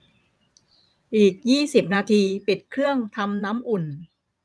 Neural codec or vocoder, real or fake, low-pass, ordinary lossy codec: vocoder, 22.05 kHz, 80 mel bands, Vocos; fake; none; none